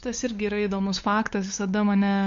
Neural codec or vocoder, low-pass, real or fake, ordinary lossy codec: codec, 16 kHz, 8 kbps, FunCodec, trained on LibriTTS, 25 frames a second; 7.2 kHz; fake; MP3, 48 kbps